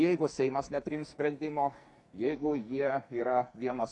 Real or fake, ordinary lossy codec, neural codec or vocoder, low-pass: fake; AAC, 64 kbps; codec, 44.1 kHz, 2.6 kbps, SNAC; 10.8 kHz